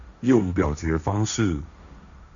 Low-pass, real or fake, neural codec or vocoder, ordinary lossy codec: 7.2 kHz; fake; codec, 16 kHz, 1.1 kbps, Voila-Tokenizer; MP3, 64 kbps